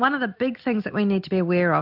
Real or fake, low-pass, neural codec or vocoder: real; 5.4 kHz; none